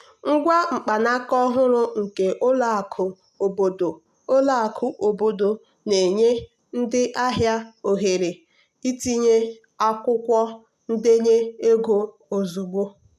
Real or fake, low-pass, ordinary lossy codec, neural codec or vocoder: real; 14.4 kHz; none; none